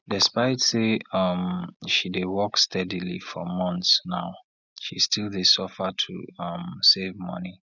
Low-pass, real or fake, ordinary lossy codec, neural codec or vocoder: 7.2 kHz; real; none; none